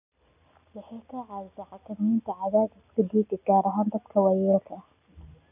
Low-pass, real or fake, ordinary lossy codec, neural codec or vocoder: 3.6 kHz; real; none; none